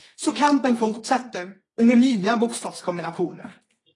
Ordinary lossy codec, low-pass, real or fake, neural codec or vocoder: AAC, 32 kbps; 10.8 kHz; fake; codec, 24 kHz, 0.9 kbps, WavTokenizer, medium music audio release